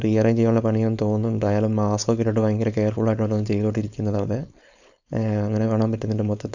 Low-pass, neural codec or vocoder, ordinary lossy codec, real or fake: 7.2 kHz; codec, 16 kHz, 4.8 kbps, FACodec; none; fake